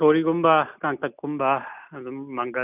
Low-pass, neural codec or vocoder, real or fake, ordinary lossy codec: 3.6 kHz; none; real; none